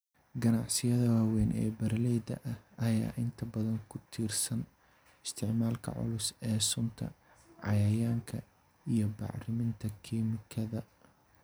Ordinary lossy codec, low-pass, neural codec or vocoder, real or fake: none; none; none; real